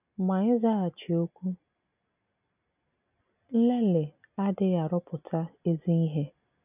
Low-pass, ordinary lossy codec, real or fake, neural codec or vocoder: 3.6 kHz; none; real; none